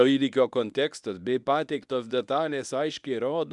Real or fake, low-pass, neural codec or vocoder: fake; 10.8 kHz; codec, 24 kHz, 0.9 kbps, WavTokenizer, medium speech release version 1